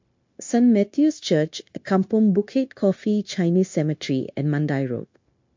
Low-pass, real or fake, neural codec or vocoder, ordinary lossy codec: 7.2 kHz; fake; codec, 16 kHz, 0.9 kbps, LongCat-Audio-Codec; MP3, 48 kbps